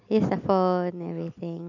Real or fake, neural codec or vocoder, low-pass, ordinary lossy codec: real; none; 7.2 kHz; none